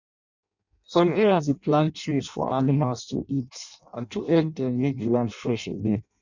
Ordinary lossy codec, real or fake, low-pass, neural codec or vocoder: none; fake; 7.2 kHz; codec, 16 kHz in and 24 kHz out, 0.6 kbps, FireRedTTS-2 codec